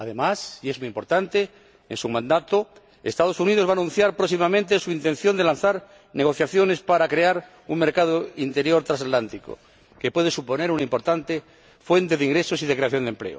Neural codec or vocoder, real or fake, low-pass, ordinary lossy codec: none; real; none; none